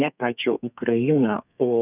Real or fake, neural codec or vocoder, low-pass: fake; codec, 32 kHz, 1.9 kbps, SNAC; 3.6 kHz